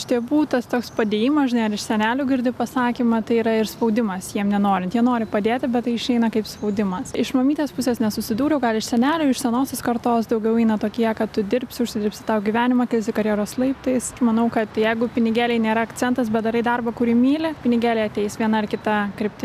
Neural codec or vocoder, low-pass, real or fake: none; 14.4 kHz; real